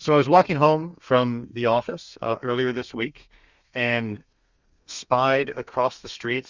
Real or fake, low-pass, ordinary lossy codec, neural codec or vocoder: fake; 7.2 kHz; Opus, 64 kbps; codec, 32 kHz, 1.9 kbps, SNAC